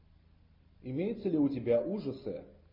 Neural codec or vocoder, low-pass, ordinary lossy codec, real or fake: none; 5.4 kHz; MP3, 24 kbps; real